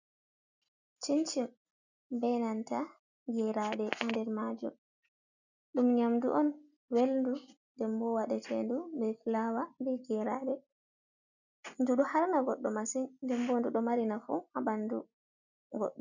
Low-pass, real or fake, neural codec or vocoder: 7.2 kHz; real; none